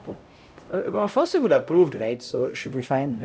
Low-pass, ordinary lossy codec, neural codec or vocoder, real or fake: none; none; codec, 16 kHz, 0.5 kbps, X-Codec, HuBERT features, trained on LibriSpeech; fake